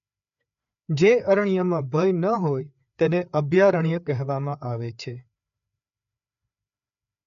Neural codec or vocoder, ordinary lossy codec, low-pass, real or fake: codec, 16 kHz, 4 kbps, FreqCodec, larger model; none; 7.2 kHz; fake